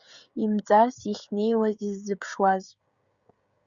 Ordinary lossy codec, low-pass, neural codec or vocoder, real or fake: Opus, 64 kbps; 7.2 kHz; codec, 16 kHz, 16 kbps, FreqCodec, smaller model; fake